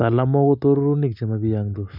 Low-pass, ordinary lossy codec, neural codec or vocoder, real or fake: 5.4 kHz; none; none; real